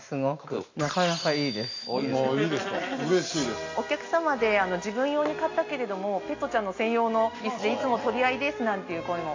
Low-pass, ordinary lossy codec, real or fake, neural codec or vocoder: 7.2 kHz; AAC, 48 kbps; real; none